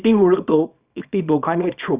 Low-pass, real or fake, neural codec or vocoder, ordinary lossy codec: 3.6 kHz; fake; codec, 24 kHz, 0.9 kbps, WavTokenizer, small release; Opus, 64 kbps